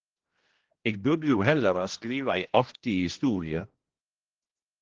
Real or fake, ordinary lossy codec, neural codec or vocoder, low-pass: fake; Opus, 16 kbps; codec, 16 kHz, 1 kbps, X-Codec, HuBERT features, trained on general audio; 7.2 kHz